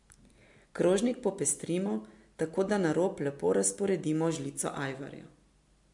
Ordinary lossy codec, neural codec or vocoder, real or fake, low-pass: MP3, 64 kbps; none; real; 10.8 kHz